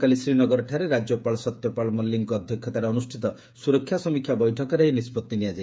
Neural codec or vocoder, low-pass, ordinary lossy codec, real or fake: codec, 16 kHz, 8 kbps, FreqCodec, smaller model; none; none; fake